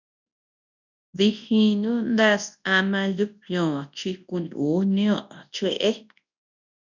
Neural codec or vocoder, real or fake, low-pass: codec, 24 kHz, 0.9 kbps, WavTokenizer, large speech release; fake; 7.2 kHz